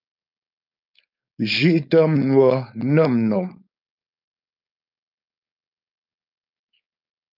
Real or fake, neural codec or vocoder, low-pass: fake; codec, 16 kHz, 4.8 kbps, FACodec; 5.4 kHz